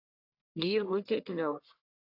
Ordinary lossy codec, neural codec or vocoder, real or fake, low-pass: AAC, 32 kbps; codec, 44.1 kHz, 1.7 kbps, Pupu-Codec; fake; 5.4 kHz